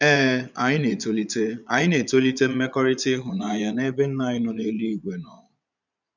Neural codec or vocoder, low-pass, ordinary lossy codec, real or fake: vocoder, 22.05 kHz, 80 mel bands, Vocos; 7.2 kHz; none; fake